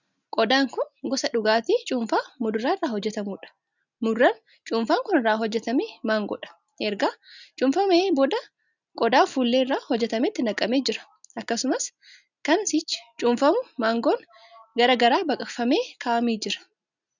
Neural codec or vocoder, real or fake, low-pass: none; real; 7.2 kHz